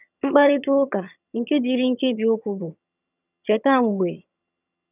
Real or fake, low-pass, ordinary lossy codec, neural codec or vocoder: fake; 3.6 kHz; none; vocoder, 22.05 kHz, 80 mel bands, HiFi-GAN